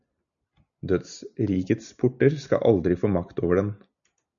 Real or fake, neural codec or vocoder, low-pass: real; none; 7.2 kHz